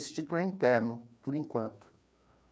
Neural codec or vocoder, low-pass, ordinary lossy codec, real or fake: codec, 16 kHz, 2 kbps, FreqCodec, larger model; none; none; fake